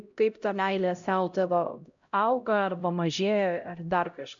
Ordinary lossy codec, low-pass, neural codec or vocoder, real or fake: MP3, 64 kbps; 7.2 kHz; codec, 16 kHz, 0.5 kbps, X-Codec, HuBERT features, trained on LibriSpeech; fake